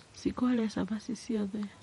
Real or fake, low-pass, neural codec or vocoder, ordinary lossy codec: fake; 19.8 kHz; vocoder, 48 kHz, 128 mel bands, Vocos; MP3, 48 kbps